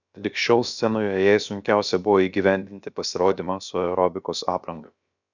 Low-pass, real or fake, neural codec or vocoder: 7.2 kHz; fake; codec, 16 kHz, about 1 kbps, DyCAST, with the encoder's durations